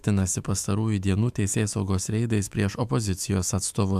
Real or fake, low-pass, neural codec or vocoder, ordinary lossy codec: fake; 14.4 kHz; autoencoder, 48 kHz, 128 numbers a frame, DAC-VAE, trained on Japanese speech; AAC, 96 kbps